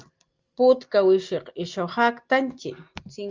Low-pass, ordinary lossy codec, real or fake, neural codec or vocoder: 7.2 kHz; Opus, 24 kbps; real; none